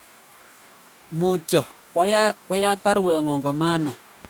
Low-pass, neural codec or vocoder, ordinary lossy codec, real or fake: none; codec, 44.1 kHz, 2.6 kbps, DAC; none; fake